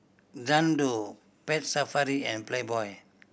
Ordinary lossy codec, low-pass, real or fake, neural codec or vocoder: none; none; real; none